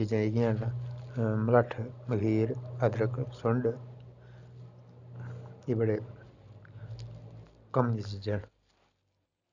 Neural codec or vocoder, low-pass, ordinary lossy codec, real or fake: none; 7.2 kHz; none; real